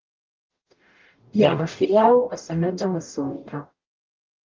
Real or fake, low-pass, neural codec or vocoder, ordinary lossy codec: fake; 7.2 kHz; codec, 44.1 kHz, 0.9 kbps, DAC; Opus, 24 kbps